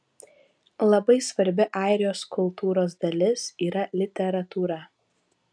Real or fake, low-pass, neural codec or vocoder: real; 9.9 kHz; none